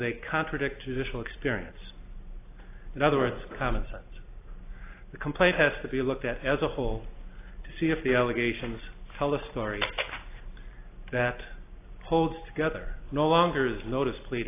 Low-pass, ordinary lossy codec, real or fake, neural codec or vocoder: 3.6 kHz; AAC, 24 kbps; real; none